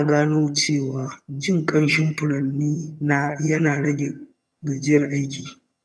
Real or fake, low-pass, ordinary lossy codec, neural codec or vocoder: fake; none; none; vocoder, 22.05 kHz, 80 mel bands, HiFi-GAN